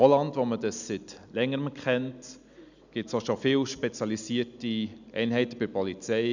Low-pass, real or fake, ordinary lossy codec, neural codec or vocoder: 7.2 kHz; real; none; none